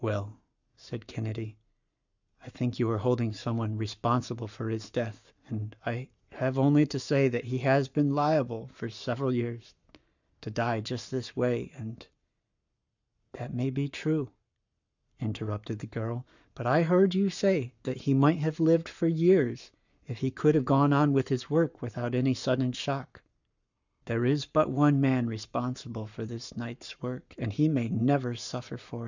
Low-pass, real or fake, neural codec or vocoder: 7.2 kHz; fake; codec, 44.1 kHz, 7.8 kbps, Pupu-Codec